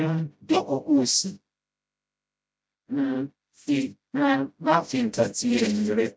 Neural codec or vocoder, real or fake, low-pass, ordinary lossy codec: codec, 16 kHz, 0.5 kbps, FreqCodec, smaller model; fake; none; none